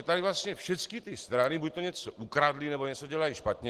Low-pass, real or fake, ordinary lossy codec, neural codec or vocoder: 14.4 kHz; real; Opus, 16 kbps; none